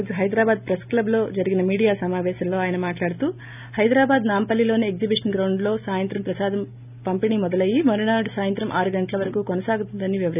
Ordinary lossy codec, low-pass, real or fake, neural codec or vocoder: none; 3.6 kHz; real; none